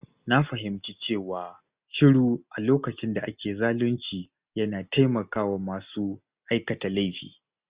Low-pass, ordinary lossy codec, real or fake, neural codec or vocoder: 3.6 kHz; Opus, 64 kbps; real; none